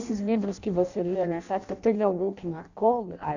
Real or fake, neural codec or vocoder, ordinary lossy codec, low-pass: fake; codec, 16 kHz in and 24 kHz out, 0.6 kbps, FireRedTTS-2 codec; none; 7.2 kHz